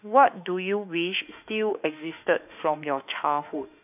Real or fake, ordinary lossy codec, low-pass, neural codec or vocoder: fake; none; 3.6 kHz; autoencoder, 48 kHz, 32 numbers a frame, DAC-VAE, trained on Japanese speech